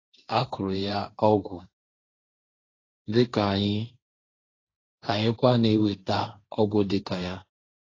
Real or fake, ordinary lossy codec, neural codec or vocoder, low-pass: fake; AAC, 32 kbps; codec, 16 kHz, 1.1 kbps, Voila-Tokenizer; 7.2 kHz